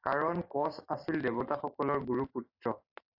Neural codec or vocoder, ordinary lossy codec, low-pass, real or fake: vocoder, 44.1 kHz, 128 mel bands every 512 samples, BigVGAN v2; AAC, 48 kbps; 5.4 kHz; fake